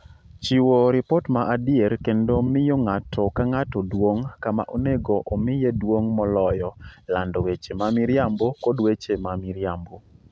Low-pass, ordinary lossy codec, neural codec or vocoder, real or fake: none; none; none; real